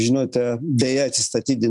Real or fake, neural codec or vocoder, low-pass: real; none; 10.8 kHz